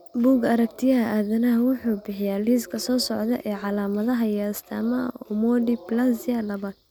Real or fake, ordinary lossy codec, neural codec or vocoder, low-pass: real; none; none; none